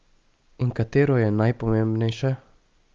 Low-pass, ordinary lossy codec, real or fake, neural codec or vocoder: 7.2 kHz; Opus, 24 kbps; real; none